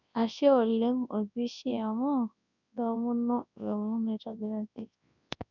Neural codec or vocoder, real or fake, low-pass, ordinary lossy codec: codec, 24 kHz, 0.9 kbps, WavTokenizer, large speech release; fake; 7.2 kHz; none